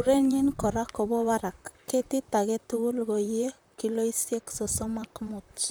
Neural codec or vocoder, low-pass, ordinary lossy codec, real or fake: vocoder, 44.1 kHz, 128 mel bands, Pupu-Vocoder; none; none; fake